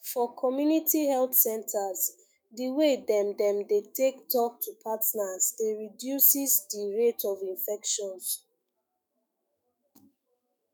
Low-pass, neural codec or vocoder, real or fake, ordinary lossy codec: none; autoencoder, 48 kHz, 128 numbers a frame, DAC-VAE, trained on Japanese speech; fake; none